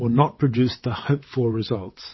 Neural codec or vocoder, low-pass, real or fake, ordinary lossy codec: vocoder, 22.05 kHz, 80 mel bands, WaveNeXt; 7.2 kHz; fake; MP3, 24 kbps